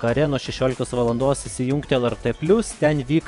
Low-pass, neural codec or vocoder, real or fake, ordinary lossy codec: 10.8 kHz; vocoder, 24 kHz, 100 mel bands, Vocos; fake; MP3, 96 kbps